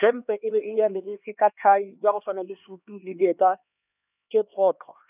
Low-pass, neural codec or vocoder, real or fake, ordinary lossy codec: 3.6 kHz; codec, 16 kHz, 2 kbps, X-Codec, HuBERT features, trained on LibriSpeech; fake; none